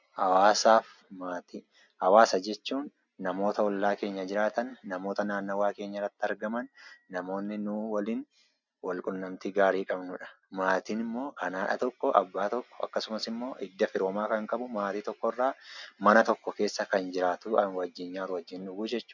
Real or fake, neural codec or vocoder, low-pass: real; none; 7.2 kHz